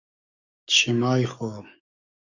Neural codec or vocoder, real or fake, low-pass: codec, 44.1 kHz, 7.8 kbps, DAC; fake; 7.2 kHz